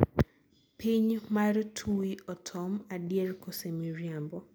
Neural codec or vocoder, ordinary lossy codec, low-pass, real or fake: none; none; none; real